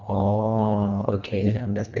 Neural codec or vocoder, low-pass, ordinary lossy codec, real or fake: codec, 24 kHz, 1.5 kbps, HILCodec; 7.2 kHz; none; fake